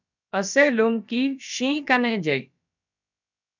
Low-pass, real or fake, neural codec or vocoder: 7.2 kHz; fake; codec, 16 kHz, about 1 kbps, DyCAST, with the encoder's durations